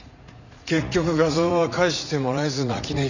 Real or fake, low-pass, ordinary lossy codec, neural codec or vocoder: fake; 7.2 kHz; none; vocoder, 44.1 kHz, 80 mel bands, Vocos